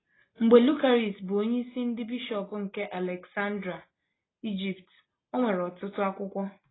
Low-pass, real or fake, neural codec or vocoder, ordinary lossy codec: 7.2 kHz; real; none; AAC, 16 kbps